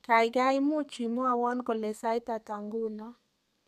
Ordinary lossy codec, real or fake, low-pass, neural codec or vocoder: none; fake; 14.4 kHz; codec, 32 kHz, 1.9 kbps, SNAC